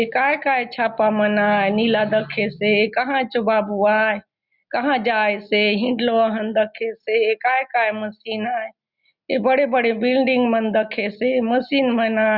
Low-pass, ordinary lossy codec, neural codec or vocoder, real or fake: 5.4 kHz; Opus, 64 kbps; none; real